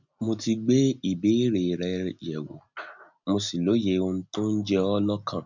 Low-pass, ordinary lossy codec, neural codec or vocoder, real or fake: 7.2 kHz; none; none; real